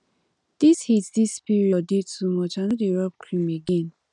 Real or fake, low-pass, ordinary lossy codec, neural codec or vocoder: real; 9.9 kHz; none; none